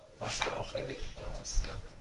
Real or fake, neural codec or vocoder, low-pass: fake; codec, 24 kHz, 0.9 kbps, WavTokenizer, medium speech release version 1; 10.8 kHz